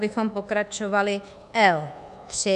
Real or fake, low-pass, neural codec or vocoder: fake; 10.8 kHz; codec, 24 kHz, 1.2 kbps, DualCodec